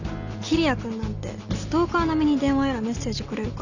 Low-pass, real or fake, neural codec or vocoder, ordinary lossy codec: 7.2 kHz; real; none; none